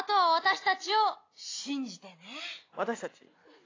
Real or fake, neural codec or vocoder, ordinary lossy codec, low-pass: real; none; AAC, 32 kbps; 7.2 kHz